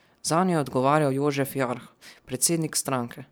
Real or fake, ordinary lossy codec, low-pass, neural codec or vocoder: fake; none; none; vocoder, 44.1 kHz, 128 mel bands every 512 samples, BigVGAN v2